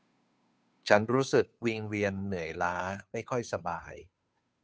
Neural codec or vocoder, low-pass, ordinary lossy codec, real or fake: codec, 16 kHz, 2 kbps, FunCodec, trained on Chinese and English, 25 frames a second; none; none; fake